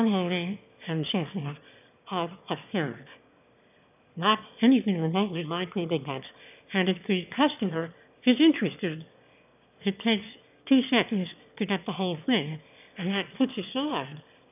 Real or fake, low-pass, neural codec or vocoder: fake; 3.6 kHz; autoencoder, 22.05 kHz, a latent of 192 numbers a frame, VITS, trained on one speaker